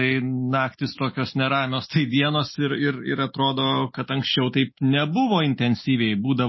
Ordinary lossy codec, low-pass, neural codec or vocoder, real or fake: MP3, 24 kbps; 7.2 kHz; none; real